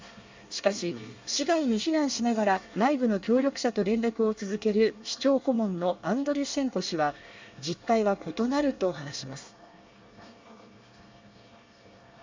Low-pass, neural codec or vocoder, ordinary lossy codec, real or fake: 7.2 kHz; codec, 24 kHz, 1 kbps, SNAC; MP3, 64 kbps; fake